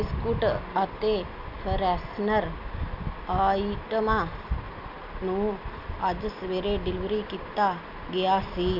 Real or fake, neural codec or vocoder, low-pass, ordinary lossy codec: real; none; 5.4 kHz; none